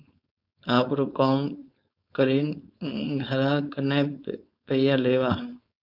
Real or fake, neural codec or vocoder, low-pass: fake; codec, 16 kHz, 4.8 kbps, FACodec; 5.4 kHz